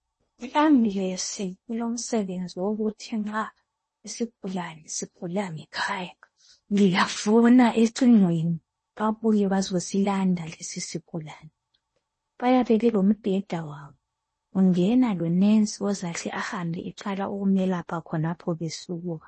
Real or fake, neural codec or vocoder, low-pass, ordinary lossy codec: fake; codec, 16 kHz in and 24 kHz out, 0.8 kbps, FocalCodec, streaming, 65536 codes; 10.8 kHz; MP3, 32 kbps